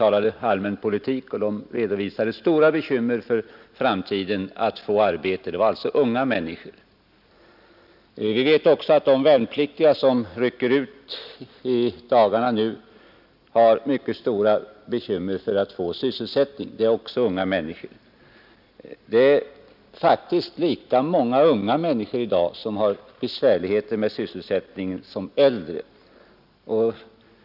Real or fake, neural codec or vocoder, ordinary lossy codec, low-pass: real; none; none; 5.4 kHz